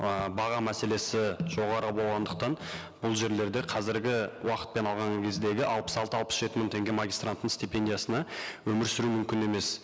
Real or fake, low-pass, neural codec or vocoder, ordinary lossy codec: real; none; none; none